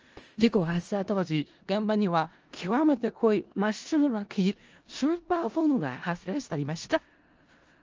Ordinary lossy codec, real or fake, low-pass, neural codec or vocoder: Opus, 24 kbps; fake; 7.2 kHz; codec, 16 kHz in and 24 kHz out, 0.4 kbps, LongCat-Audio-Codec, four codebook decoder